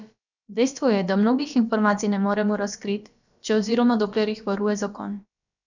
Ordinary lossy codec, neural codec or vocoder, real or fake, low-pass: none; codec, 16 kHz, about 1 kbps, DyCAST, with the encoder's durations; fake; 7.2 kHz